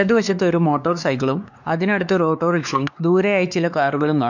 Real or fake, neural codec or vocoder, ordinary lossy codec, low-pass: fake; codec, 16 kHz, 2 kbps, X-Codec, WavLM features, trained on Multilingual LibriSpeech; none; 7.2 kHz